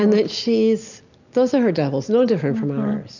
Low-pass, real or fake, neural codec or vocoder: 7.2 kHz; real; none